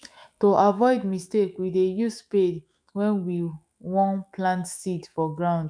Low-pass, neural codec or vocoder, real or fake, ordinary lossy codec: 9.9 kHz; autoencoder, 48 kHz, 128 numbers a frame, DAC-VAE, trained on Japanese speech; fake; none